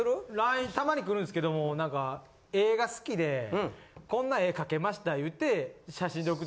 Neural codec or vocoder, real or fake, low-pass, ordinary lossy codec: none; real; none; none